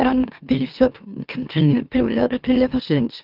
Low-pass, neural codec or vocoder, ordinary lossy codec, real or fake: 5.4 kHz; autoencoder, 44.1 kHz, a latent of 192 numbers a frame, MeloTTS; Opus, 32 kbps; fake